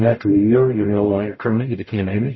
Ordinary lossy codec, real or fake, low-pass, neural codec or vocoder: MP3, 24 kbps; fake; 7.2 kHz; codec, 44.1 kHz, 0.9 kbps, DAC